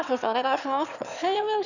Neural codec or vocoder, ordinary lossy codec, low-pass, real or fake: autoencoder, 22.05 kHz, a latent of 192 numbers a frame, VITS, trained on one speaker; none; 7.2 kHz; fake